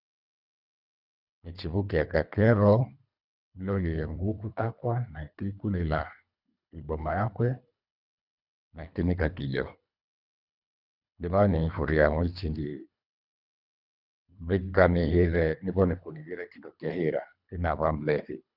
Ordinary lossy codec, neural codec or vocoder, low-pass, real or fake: none; codec, 24 kHz, 3 kbps, HILCodec; 5.4 kHz; fake